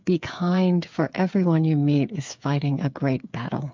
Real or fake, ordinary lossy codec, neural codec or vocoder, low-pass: fake; MP3, 64 kbps; codec, 16 kHz, 8 kbps, FreqCodec, smaller model; 7.2 kHz